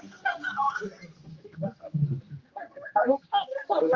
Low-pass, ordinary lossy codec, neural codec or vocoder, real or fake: 7.2 kHz; Opus, 32 kbps; codec, 16 kHz, 1 kbps, X-Codec, HuBERT features, trained on general audio; fake